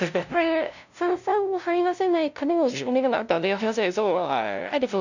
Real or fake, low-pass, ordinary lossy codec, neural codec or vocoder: fake; 7.2 kHz; none; codec, 16 kHz, 0.5 kbps, FunCodec, trained on LibriTTS, 25 frames a second